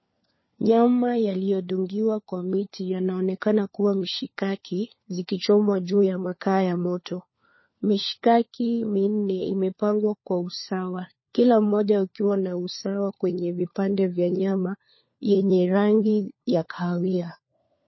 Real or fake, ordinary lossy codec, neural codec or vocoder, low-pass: fake; MP3, 24 kbps; codec, 16 kHz, 4 kbps, FunCodec, trained on LibriTTS, 50 frames a second; 7.2 kHz